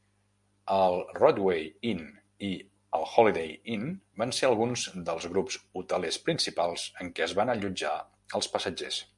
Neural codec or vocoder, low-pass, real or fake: none; 10.8 kHz; real